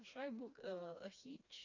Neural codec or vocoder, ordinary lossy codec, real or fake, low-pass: codec, 16 kHz, 2 kbps, FreqCodec, smaller model; Opus, 64 kbps; fake; 7.2 kHz